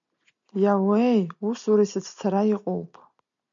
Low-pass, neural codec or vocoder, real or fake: 7.2 kHz; none; real